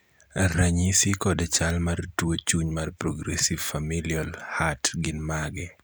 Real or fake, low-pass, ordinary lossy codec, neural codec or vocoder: real; none; none; none